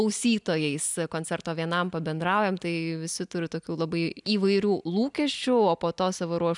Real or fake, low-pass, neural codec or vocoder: real; 10.8 kHz; none